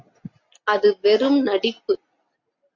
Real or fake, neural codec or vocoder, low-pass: real; none; 7.2 kHz